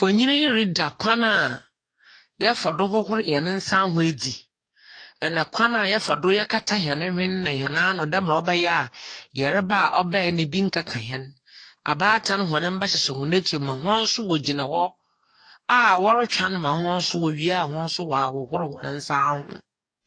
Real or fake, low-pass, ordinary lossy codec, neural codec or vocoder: fake; 9.9 kHz; AAC, 48 kbps; codec, 44.1 kHz, 2.6 kbps, DAC